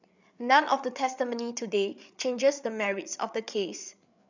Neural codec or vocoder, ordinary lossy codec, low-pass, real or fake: codec, 16 kHz, 8 kbps, FreqCodec, larger model; none; 7.2 kHz; fake